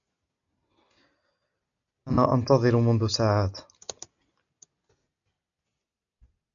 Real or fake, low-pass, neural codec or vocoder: real; 7.2 kHz; none